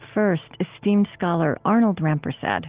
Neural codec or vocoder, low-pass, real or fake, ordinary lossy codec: none; 3.6 kHz; real; Opus, 16 kbps